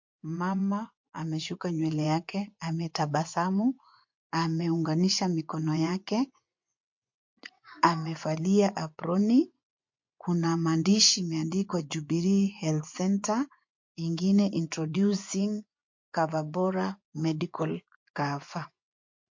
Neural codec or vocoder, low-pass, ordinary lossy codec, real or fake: vocoder, 24 kHz, 100 mel bands, Vocos; 7.2 kHz; MP3, 48 kbps; fake